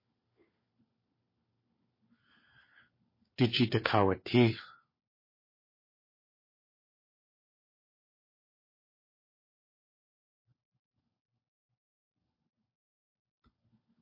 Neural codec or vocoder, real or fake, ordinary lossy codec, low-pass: codec, 16 kHz, 4 kbps, FunCodec, trained on LibriTTS, 50 frames a second; fake; MP3, 24 kbps; 5.4 kHz